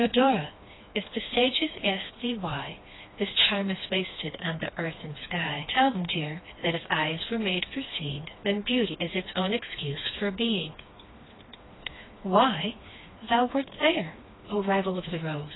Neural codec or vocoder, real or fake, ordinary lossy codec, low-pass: codec, 16 kHz, 2 kbps, FreqCodec, smaller model; fake; AAC, 16 kbps; 7.2 kHz